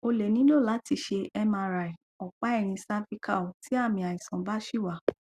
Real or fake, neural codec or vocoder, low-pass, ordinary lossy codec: real; none; 14.4 kHz; Opus, 64 kbps